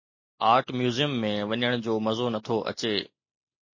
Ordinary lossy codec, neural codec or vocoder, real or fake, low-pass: MP3, 32 kbps; none; real; 7.2 kHz